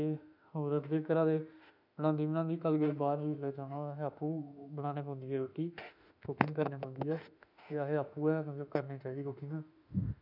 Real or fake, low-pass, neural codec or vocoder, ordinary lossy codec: fake; 5.4 kHz; autoencoder, 48 kHz, 32 numbers a frame, DAC-VAE, trained on Japanese speech; none